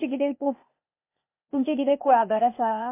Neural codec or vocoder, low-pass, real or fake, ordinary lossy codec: codec, 16 kHz, 0.8 kbps, ZipCodec; 3.6 kHz; fake; MP3, 24 kbps